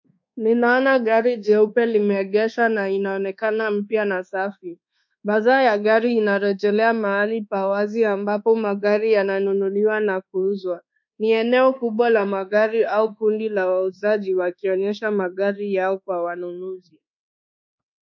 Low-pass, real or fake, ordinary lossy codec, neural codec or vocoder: 7.2 kHz; fake; MP3, 64 kbps; codec, 24 kHz, 1.2 kbps, DualCodec